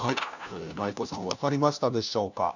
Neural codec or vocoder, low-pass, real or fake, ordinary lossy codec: codec, 16 kHz, 1 kbps, FunCodec, trained on LibriTTS, 50 frames a second; 7.2 kHz; fake; none